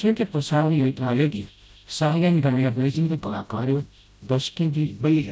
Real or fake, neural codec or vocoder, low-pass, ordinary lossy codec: fake; codec, 16 kHz, 0.5 kbps, FreqCodec, smaller model; none; none